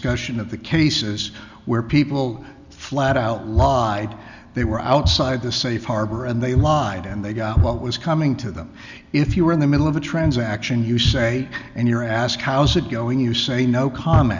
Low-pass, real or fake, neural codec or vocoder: 7.2 kHz; real; none